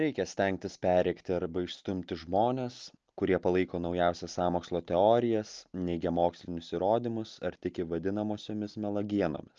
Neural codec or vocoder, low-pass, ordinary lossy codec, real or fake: none; 7.2 kHz; Opus, 24 kbps; real